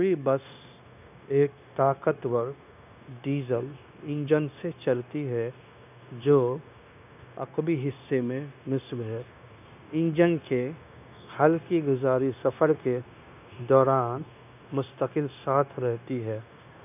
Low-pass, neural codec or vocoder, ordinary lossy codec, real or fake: 3.6 kHz; codec, 16 kHz, 0.9 kbps, LongCat-Audio-Codec; none; fake